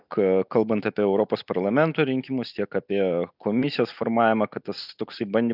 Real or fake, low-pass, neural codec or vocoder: real; 5.4 kHz; none